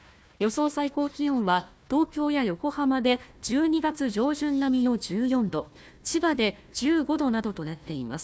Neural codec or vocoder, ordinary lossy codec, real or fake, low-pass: codec, 16 kHz, 1 kbps, FunCodec, trained on Chinese and English, 50 frames a second; none; fake; none